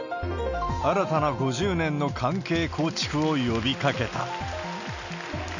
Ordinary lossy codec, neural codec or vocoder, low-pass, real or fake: none; none; 7.2 kHz; real